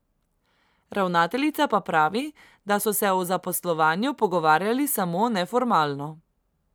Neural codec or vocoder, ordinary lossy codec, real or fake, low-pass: none; none; real; none